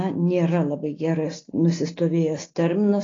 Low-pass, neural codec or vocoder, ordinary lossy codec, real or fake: 7.2 kHz; none; AAC, 32 kbps; real